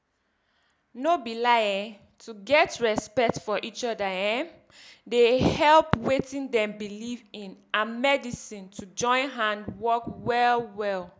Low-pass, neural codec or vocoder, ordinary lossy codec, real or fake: none; none; none; real